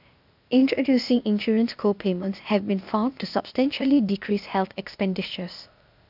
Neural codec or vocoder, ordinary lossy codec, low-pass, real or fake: codec, 16 kHz, 0.8 kbps, ZipCodec; none; 5.4 kHz; fake